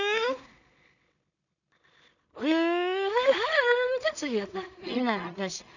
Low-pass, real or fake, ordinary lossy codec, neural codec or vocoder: 7.2 kHz; fake; none; codec, 16 kHz in and 24 kHz out, 0.4 kbps, LongCat-Audio-Codec, two codebook decoder